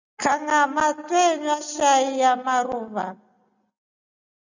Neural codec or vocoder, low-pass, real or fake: none; 7.2 kHz; real